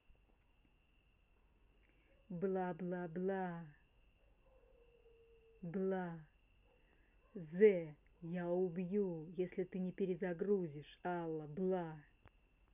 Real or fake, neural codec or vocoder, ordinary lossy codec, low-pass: real; none; none; 3.6 kHz